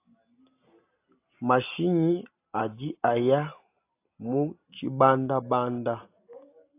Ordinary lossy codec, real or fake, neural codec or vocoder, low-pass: AAC, 24 kbps; real; none; 3.6 kHz